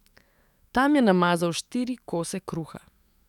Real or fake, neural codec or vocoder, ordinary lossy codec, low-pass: fake; autoencoder, 48 kHz, 128 numbers a frame, DAC-VAE, trained on Japanese speech; none; 19.8 kHz